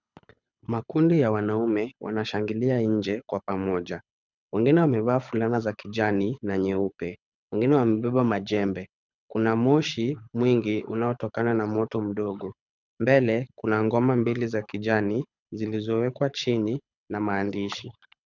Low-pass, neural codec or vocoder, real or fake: 7.2 kHz; codec, 24 kHz, 6 kbps, HILCodec; fake